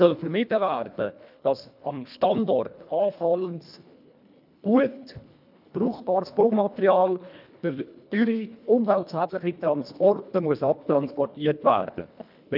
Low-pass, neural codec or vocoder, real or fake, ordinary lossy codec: 5.4 kHz; codec, 24 kHz, 1.5 kbps, HILCodec; fake; none